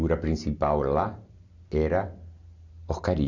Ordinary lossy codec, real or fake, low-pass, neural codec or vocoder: none; real; 7.2 kHz; none